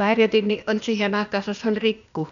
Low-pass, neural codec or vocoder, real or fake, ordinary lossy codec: 7.2 kHz; codec, 16 kHz, 0.8 kbps, ZipCodec; fake; none